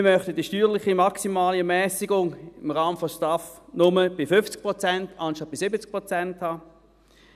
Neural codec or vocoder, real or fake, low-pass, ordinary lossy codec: none; real; 14.4 kHz; none